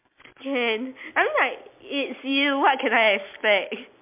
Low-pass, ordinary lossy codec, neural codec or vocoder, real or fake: 3.6 kHz; MP3, 32 kbps; none; real